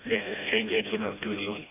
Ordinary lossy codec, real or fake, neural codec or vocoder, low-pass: none; fake; codec, 16 kHz, 1 kbps, FreqCodec, smaller model; 3.6 kHz